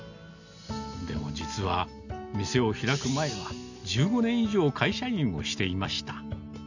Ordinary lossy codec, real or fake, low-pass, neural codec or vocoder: none; real; 7.2 kHz; none